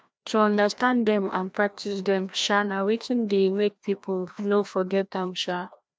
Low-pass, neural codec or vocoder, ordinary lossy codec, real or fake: none; codec, 16 kHz, 1 kbps, FreqCodec, larger model; none; fake